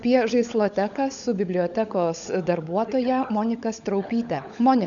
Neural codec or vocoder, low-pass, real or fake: codec, 16 kHz, 16 kbps, FunCodec, trained on LibriTTS, 50 frames a second; 7.2 kHz; fake